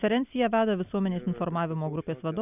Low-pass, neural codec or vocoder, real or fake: 3.6 kHz; none; real